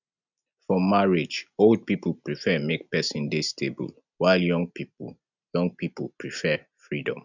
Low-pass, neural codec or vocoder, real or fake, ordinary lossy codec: 7.2 kHz; none; real; none